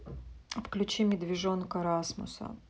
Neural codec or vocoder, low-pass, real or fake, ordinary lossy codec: none; none; real; none